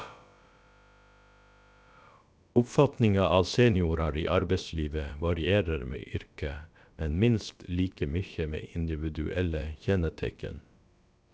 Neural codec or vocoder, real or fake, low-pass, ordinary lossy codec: codec, 16 kHz, about 1 kbps, DyCAST, with the encoder's durations; fake; none; none